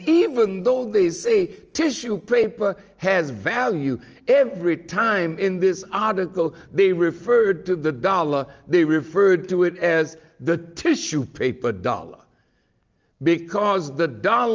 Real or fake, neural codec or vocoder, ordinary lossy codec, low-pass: real; none; Opus, 24 kbps; 7.2 kHz